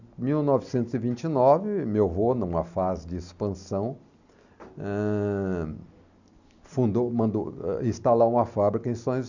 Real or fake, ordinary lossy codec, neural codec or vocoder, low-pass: real; none; none; 7.2 kHz